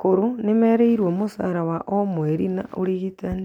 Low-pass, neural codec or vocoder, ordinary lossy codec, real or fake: 19.8 kHz; none; none; real